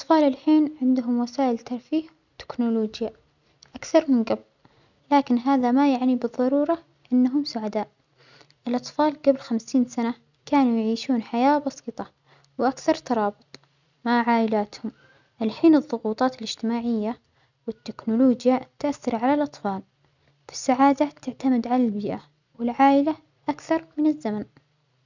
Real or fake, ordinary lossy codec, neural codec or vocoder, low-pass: real; none; none; 7.2 kHz